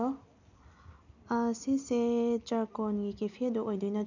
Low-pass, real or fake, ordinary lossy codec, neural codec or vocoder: 7.2 kHz; real; none; none